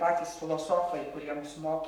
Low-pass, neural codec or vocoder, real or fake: 19.8 kHz; codec, 44.1 kHz, 7.8 kbps, Pupu-Codec; fake